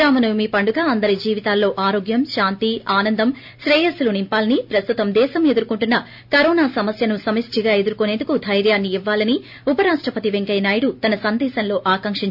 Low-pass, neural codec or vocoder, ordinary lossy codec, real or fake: 5.4 kHz; none; MP3, 48 kbps; real